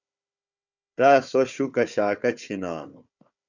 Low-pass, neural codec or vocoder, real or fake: 7.2 kHz; codec, 16 kHz, 4 kbps, FunCodec, trained on Chinese and English, 50 frames a second; fake